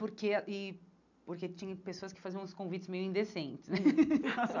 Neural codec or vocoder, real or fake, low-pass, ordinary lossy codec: none; real; 7.2 kHz; none